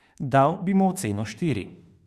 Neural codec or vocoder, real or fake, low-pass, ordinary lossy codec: autoencoder, 48 kHz, 32 numbers a frame, DAC-VAE, trained on Japanese speech; fake; 14.4 kHz; Opus, 64 kbps